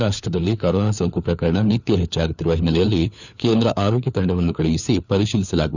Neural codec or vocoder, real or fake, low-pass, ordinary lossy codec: codec, 16 kHz, 4 kbps, FunCodec, trained on LibriTTS, 50 frames a second; fake; 7.2 kHz; none